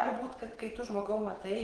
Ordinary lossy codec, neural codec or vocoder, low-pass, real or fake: Opus, 16 kbps; vocoder, 22.05 kHz, 80 mel bands, WaveNeXt; 9.9 kHz; fake